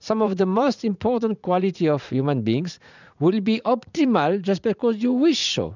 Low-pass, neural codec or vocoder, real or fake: 7.2 kHz; vocoder, 44.1 kHz, 80 mel bands, Vocos; fake